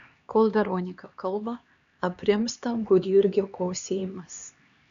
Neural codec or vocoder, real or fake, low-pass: codec, 16 kHz, 2 kbps, X-Codec, HuBERT features, trained on LibriSpeech; fake; 7.2 kHz